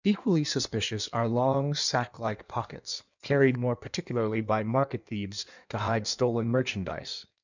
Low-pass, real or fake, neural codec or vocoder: 7.2 kHz; fake; codec, 16 kHz in and 24 kHz out, 1.1 kbps, FireRedTTS-2 codec